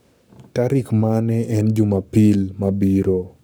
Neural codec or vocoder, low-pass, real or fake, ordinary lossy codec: codec, 44.1 kHz, 7.8 kbps, Pupu-Codec; none; fake; none